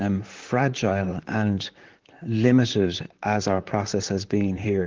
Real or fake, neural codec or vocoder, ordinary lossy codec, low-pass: fake; vocoder, 44.1 kHz, 128 mel bands, Pupu-Vocoder; Opus, 16 kbps; 7.2 kHz